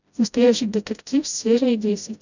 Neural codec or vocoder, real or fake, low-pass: codec, 16 kHz, 0.5 kbps, FreqCodec, smaller model; fake; 7.2 kHz